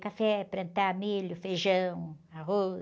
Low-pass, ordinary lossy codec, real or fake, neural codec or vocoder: none; none; real; none